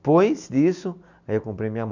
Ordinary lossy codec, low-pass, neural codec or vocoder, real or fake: none; 7.2 kHz; none; real